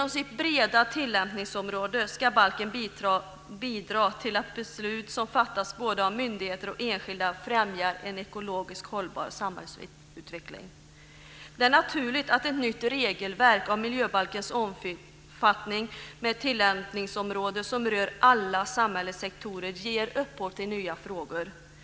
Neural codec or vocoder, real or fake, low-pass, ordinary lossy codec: none; real; none; none